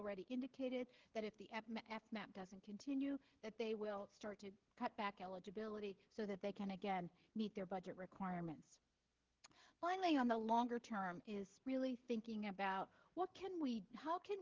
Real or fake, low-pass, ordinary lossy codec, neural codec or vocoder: fake; 7.2 kHz; Opus, 16 kbps; codec, 16 kHz, 16 kbps, FreqCodec, smaller model